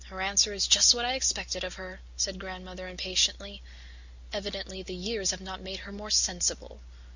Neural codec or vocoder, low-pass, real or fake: none; 7.2 kHz; real